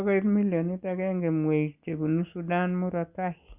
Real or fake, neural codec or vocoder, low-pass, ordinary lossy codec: real; none; 3.6 kHz; Opus, 64 kbps